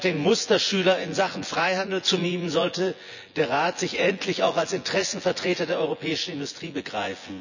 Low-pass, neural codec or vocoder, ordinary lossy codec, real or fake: 7.2 kHz; vocoder, 24 kHz, 100 mel bands, Vocos; none; fake